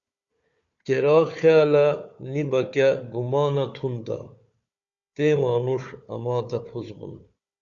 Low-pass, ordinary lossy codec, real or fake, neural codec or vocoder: 7.2 kHz; Opus, 64 kbps; fake; codec, 16 kHz, 4 kbps, FunCodec, trained on Chinese and English, 50 frames a second